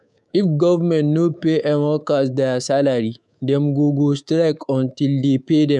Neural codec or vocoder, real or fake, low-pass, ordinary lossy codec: codec, 24 kHz, 3.1 kbps, DualCodec; fake; none; none